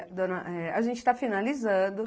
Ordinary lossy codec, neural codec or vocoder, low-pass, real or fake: none; none; none; real